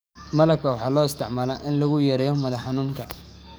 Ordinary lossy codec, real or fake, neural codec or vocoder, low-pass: none; fake; codec, 44.1 kHz, 7.8 kbps, DAC; none